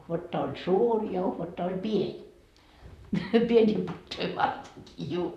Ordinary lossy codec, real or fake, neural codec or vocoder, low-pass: none; fake; vocoder, 44.1 kHz, 128 mel bands, Pupu-Vocoder; 14.4 kHz